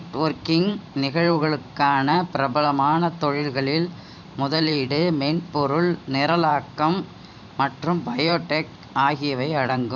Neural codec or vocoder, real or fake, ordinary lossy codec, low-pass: vocoder, 44.1 kHz, 128 mel bands every 512 samples, BigVGAN v2; fake; none; 7.2 kHz